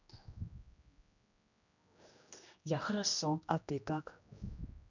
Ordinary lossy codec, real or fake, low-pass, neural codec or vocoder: none; fake; 7.2 kHz; codec, 16 kHz, 1 kbps, X-Codec, HuBERT features, trained on general audio